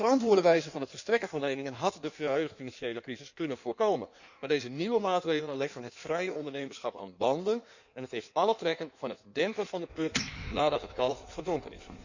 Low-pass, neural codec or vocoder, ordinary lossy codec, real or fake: 7.2 kHz; codec, 16 kHz in and 24 kHz out, 1.1 kbps, FireRedTTS-2 codec; none; fake